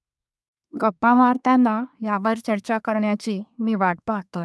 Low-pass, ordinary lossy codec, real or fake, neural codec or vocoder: none; none; fake; codec, 24 kHz, 1 kbps, SNAC